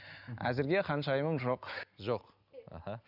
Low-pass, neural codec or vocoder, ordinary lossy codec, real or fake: 5.4 kHz; none; none; real